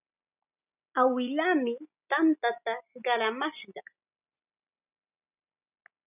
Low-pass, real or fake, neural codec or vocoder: 3.6 kHz; real; none